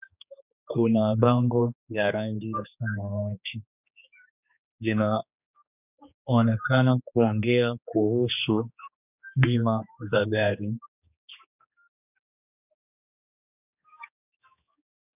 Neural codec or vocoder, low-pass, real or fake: codec, 16 kHz, 2 kbps, X-Codec, HuBERT features, trained on general audio; 3.6 kHz; fake